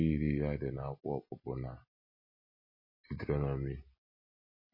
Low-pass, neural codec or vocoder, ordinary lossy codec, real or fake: 5.4 kHz; none; MP3, 24 kbps; real